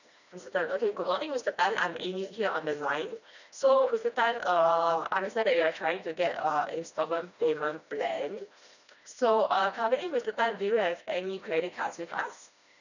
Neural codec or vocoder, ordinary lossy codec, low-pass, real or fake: codec, 16 kHz, 1 kbps, FreqCodec, smaller model; none; 7.2 kHz; fake